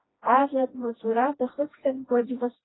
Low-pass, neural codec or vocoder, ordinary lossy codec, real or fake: 7.2 kHz; codec, 16 kHz, 1 kbps, FreqCodec, smaller model; AAC, 16 kbps; fake